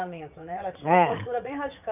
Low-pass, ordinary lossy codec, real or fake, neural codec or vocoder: 3.6 kHz; none; fake; vocoder, 44.1 kHz, 80 mel bands, Vocos